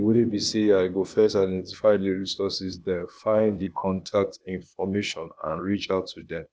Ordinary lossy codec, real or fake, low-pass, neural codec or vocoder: none; fake; none; codec, 16 kHz, 0.8 kbps, ZipCodec